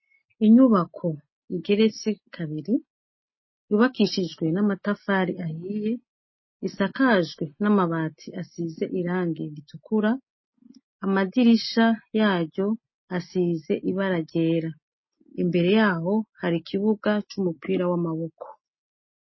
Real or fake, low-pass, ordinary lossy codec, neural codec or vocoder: real; 7.2 kHz; MP3, 24 kbps; none